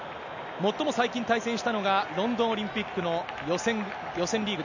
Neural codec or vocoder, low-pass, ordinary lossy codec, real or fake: none; 7.2 kHz; none; real